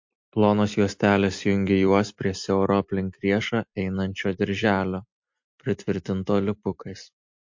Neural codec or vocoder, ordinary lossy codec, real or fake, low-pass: vocoder, 44.1 kHz, 128 mel bands every 256 samples, BigVGAN v2; MP3, 48 kbps; fake; 7.2 kHz